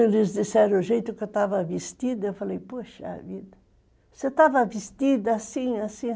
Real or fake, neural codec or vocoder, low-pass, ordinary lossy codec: real; none; none; none